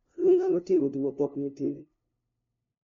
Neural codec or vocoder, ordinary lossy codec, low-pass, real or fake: codec, 16 kHz, 0.5 kbps, FunCodec, trained on LibriTTS, 25 frames a second; MP3, 48 kbps; 7.2 kHz; fake